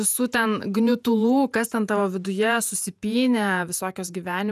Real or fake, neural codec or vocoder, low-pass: fake; vocoder, 48 kHz, 128 mel bands, Vocos; 14.4 kHz